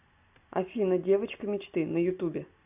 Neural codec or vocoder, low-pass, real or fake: none; 3.6 kHz; real